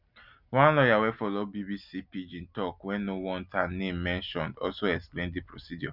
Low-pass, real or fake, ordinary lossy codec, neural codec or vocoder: 5.4 kHz; real; none; none